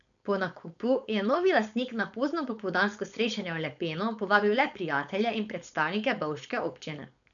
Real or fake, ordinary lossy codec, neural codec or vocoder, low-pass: fake; none; codec, 16 kHz, 4.8 kbps, FACodec; 7.2 kHz